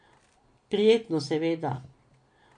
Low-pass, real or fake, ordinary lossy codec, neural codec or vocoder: 9.9 kHz; real; MP3, 48 kbps; none